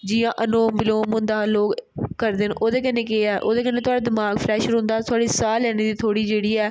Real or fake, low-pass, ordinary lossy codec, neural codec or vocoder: real; none; none; none